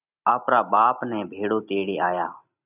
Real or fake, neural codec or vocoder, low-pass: real; none; 3.6 kHz